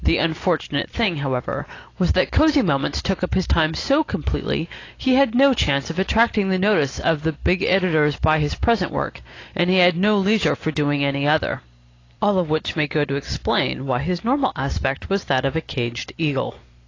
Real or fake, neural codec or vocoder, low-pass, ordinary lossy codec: real; none; 7.2 kHz; AAC, 32 kbps